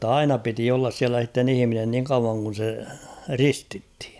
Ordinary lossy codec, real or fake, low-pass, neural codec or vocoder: none; real; none; none